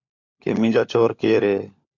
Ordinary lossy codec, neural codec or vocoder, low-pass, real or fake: MP3, 64 kbps; codec, 16 kHz, 16 kbps, FunCodec, trained on LibriTTS, 50 frames a second; 7.2 kHz; fake